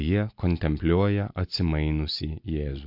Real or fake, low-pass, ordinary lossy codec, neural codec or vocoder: real; 5.4 kHz; MP3, 48 kbps; none